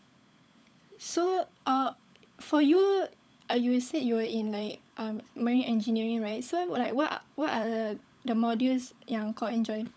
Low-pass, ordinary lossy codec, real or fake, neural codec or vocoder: none; none; fake; codec, 16 kHz, 16 kbps, FunCodec, trained on LibriTTS, 50 frames a second